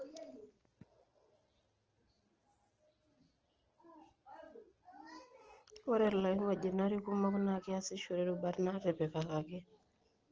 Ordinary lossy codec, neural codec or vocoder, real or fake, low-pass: Opus, 16 kbps; none; real; 7.2 kHz